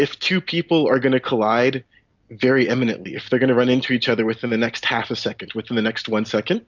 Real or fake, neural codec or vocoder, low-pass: real; none; 7.2 kHz